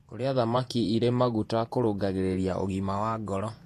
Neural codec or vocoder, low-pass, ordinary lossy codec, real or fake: vocoder, 48 kHz, 128 mel bands, Vocos; 14.4 kHz; AAC, 48 kbps; fake